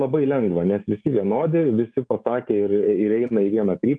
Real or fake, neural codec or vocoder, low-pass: fake; codec, 44.1 kHz, 7.8 kbps, DAC; 9.9 kHz